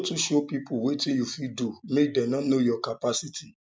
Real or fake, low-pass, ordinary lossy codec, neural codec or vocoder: real; none; none; none